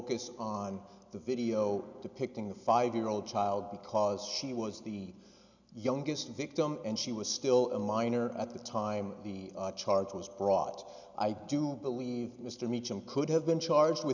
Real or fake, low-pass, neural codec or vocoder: real; 7.2 kHz; none